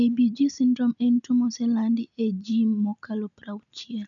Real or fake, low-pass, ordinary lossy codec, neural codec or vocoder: real; 7.2 kHz; none; none